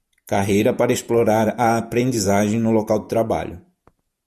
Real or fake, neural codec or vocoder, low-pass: fake; vocoder, 48 kHz, 128 mel bands, Vocos; 14.4 kHz